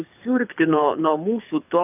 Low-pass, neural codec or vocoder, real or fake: 3.6 kHz; codec, 24 kHz, 6 kbps, HILCodec; fake